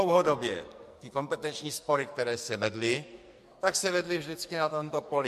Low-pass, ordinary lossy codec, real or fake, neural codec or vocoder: 14.4 kHz; MP3, 64 kbps; fake; codec, 44.1 kHz, 2.6 kbps, SNAC